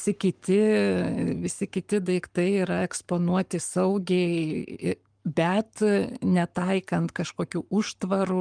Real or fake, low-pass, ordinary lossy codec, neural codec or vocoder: fake; 9.9 kHz; Opus, 32 kbps; codec, 44.1 kHz, 7.8 kbps, Pupu-Codec